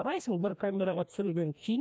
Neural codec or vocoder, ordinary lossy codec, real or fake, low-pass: codec, 16 kHz, 1 kbps, FreqCodec, larger model; none; fake; none